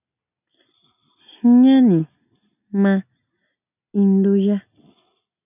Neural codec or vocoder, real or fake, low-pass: none; real; 3.6 kHz